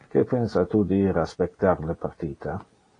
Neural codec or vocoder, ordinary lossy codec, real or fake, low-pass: none; AAC, 32 kbps; real; 9.9 kHz